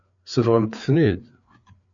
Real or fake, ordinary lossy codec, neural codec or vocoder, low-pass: fake; MP3, 64 kbps; codec, 16 kHz, 4 kbps, FreqCodec, larger model; 7.2 kHz